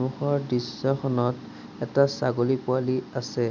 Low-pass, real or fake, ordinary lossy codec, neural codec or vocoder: 7.2 kHz; real; none; none